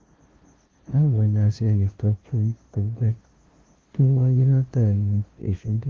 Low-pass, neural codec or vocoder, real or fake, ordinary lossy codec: 7.2 kHz; codec, 16 kHz, 0.5 kbps, FunCodec, trained on LibriTTS, 25 frames a second; fake; Opus, 16 kbps